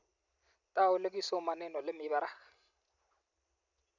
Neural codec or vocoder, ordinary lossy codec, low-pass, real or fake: none; none; 7.2 kHz; real